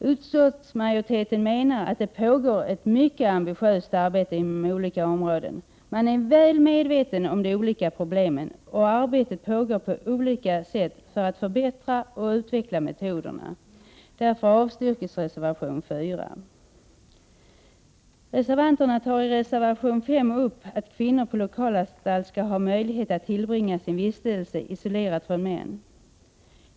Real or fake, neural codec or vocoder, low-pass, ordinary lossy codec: real; none; none; none